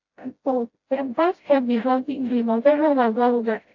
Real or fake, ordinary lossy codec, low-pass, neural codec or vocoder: fake; AAC, 48 kbps; 7.2 kHz; codec, 16 kHz, 0.5 kbps, FreqCodec, smaller model